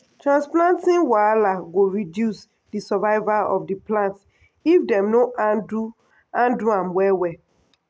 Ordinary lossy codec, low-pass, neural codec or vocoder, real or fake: none; none; none; real